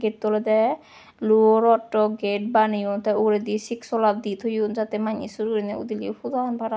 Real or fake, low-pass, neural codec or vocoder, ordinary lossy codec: real; none; none; none